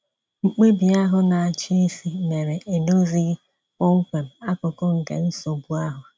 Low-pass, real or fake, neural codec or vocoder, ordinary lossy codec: none; real; none; none